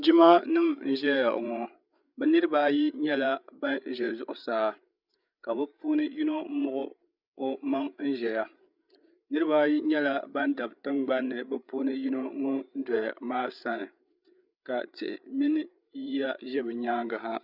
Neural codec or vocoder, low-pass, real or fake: codec, 16 kHz, 8 kbps, FreqCodec, larger model; 5.4 kHz; fake